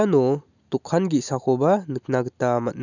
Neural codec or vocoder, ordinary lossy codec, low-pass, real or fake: none; none; 7.2 kHz; real